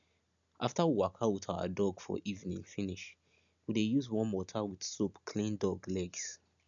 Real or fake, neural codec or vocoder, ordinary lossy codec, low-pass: real; none; none; 7.2 kHz